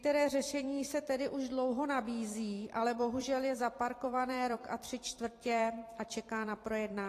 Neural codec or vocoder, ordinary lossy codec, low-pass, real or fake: vocoder, 44.1 kHz, 128 mel bands every 256 samples, BigVGAN v2; AAC, 48 kbps; 14.4 kHz; fake